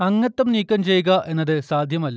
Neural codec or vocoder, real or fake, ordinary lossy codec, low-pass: none; real; none; none